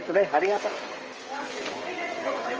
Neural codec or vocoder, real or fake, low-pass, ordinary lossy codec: codec, 16 kHz, 16 kbps, FreqCodec, smaller model; fake; 7.2 kHz; Opus, 24 kbps